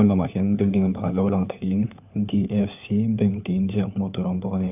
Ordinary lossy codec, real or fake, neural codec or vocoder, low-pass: none; fake; codec, 16 kHz, 4 kbps, FunCodec, trained on LibriTTS, 50 frames a second; 3.6 kHz